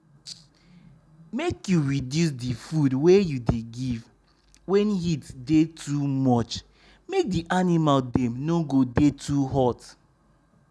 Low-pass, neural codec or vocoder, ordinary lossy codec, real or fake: none; none; none; real